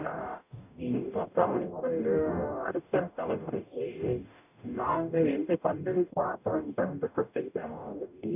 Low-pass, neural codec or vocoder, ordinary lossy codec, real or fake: 3.6 kHz; codec, 44.1 kHz, 0.9 kbps, DAC; none; fake